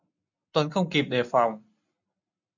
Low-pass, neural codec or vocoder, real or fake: 7.2 kHz; none; real